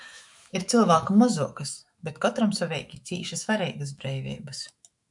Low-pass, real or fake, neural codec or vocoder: 10.8 kHz; fake; autoencoder, 48 kHz, 128 numbers a frame, DAC-VAE, trained on Japanese speech